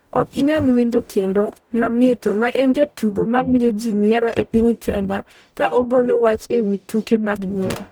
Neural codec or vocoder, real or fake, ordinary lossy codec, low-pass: codec, 44.1 kHz, 0.9 kbps, DAC; fake; none; none